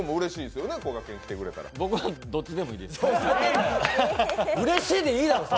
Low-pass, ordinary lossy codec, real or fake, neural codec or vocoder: none; none; real; none